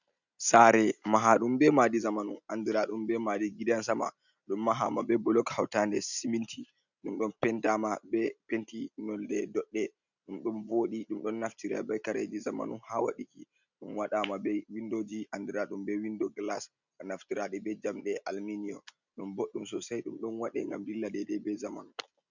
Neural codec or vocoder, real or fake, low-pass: none; real; 7.2 kHz